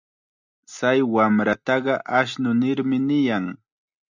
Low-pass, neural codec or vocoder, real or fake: 7.2 kHz; none; real